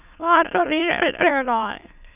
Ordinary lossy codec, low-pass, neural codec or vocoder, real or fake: none; 3.6 kHz; autoencoder, 22.05 kHz, a latent of 192 numbers a frame, VITS, trained on many speakers; fake